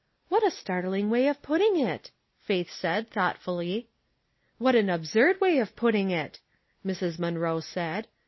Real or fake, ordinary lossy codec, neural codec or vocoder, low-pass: real; MP3, 24 kbps; none; 7.2 kHz